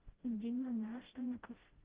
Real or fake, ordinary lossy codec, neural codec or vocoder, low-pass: fake; Opus, 16 kbps; codec, 16 kHz, 0.5 kbps, FreqCodec, smaller model; 3.6 kHz